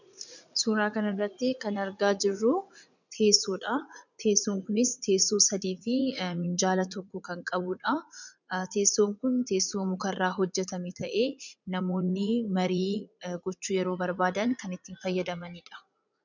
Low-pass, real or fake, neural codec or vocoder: 7.2 kHz; fake; vocoder, 44.1 kHz, 80 mel bands, Vocos